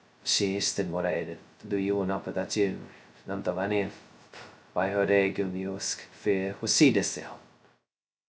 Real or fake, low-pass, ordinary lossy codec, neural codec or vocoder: fake; none; none; codec, 16 kHz, 0.2 kbps, FocalCodec